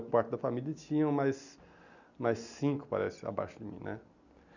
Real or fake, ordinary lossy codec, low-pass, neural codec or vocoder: real; none; 7.2 kHz; none